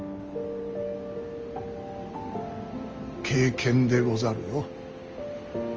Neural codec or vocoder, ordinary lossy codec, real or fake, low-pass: none; Opus, 24 kbps; real; 7.2 kHz